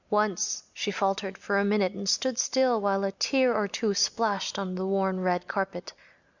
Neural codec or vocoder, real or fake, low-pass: none; real; 7.2 kHz